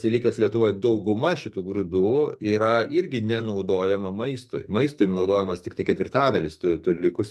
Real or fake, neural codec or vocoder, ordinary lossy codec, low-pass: fake; codec, 44.1 kHz, 2.6 kbps, SNAC; MP3, 96 kbps; 14.4 kHz